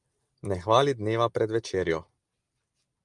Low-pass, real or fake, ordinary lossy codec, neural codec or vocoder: 10.8 kHz; real; Opus, 32 kbps; none